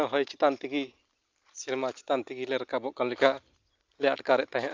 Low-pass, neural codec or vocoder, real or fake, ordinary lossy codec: 7.2 kHz; none; real; Opus, 24 kbps